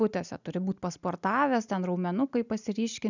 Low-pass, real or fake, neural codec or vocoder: 7.2 kHz; real; none